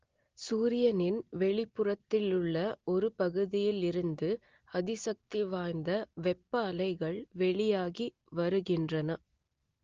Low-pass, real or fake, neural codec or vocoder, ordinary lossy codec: 7.2 kHz; real; none; Opus, 16 kbps